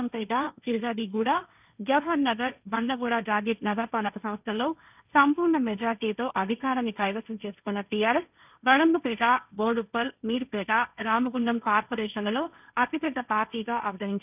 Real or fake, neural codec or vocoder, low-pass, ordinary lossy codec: fake; codec, 16 kHz, 1.1 kbps, Voila-Tokenizer; 3.6 kHz; none